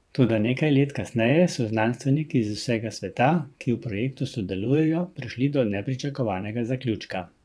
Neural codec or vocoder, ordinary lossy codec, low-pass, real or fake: vocoder, 22.05 kHz, 80 mel bands, WaveNeXt; none; none; fake